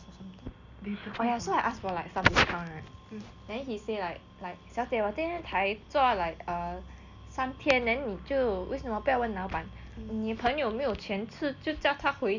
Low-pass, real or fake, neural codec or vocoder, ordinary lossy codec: 7.2 kHz; real; none; none